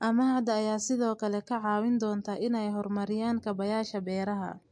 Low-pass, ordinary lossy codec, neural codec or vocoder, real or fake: 9.9 kHz; MP3, 48 kbps; none; real